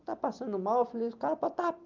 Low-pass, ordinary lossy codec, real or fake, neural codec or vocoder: 7.2 kHz; Opus, 32 kbps; real; none